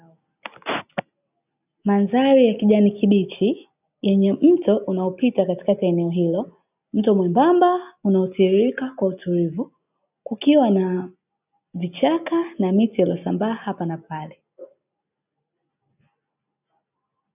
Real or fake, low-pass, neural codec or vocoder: real; 3.6 kHz; none